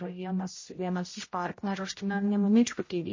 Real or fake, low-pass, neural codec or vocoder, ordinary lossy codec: fake; 7.2 kHz; codec, 16 kHz, 0.5 kbps, X-Codec, HuBERT features, trained on general audio; MP3, 32 kbps